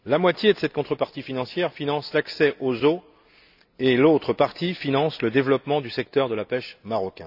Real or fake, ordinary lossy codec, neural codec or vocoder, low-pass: real; none; none; 5.4 kHz